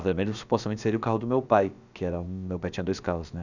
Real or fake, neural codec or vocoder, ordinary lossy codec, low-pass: fake; codec, 16 kHz, about 1 kbps, DyCAST, with the encoder's durations; none; 7.2 kHz